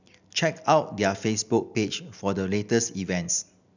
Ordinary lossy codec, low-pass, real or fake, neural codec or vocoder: none; 7.2 kHz; real; none